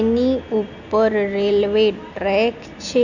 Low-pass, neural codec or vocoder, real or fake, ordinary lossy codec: 7.2 kHz; none; real; none